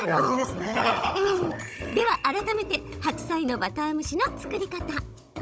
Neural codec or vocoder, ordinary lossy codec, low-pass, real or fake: codec, 16 kHz, 16 kbps, FunCodec, trained on Chinese and English, 50 frames a second; none; none; fake